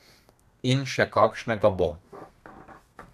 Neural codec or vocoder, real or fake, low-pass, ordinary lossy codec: codec, 32 kHz, 1.9 kbps, SNAC; fake; 14.4 kHz; none